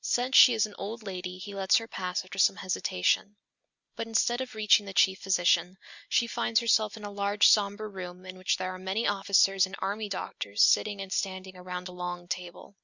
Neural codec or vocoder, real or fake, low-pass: none; real; 7.2 kHz